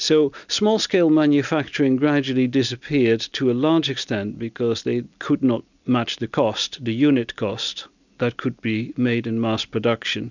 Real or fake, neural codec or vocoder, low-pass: real; none; 7.2 kHz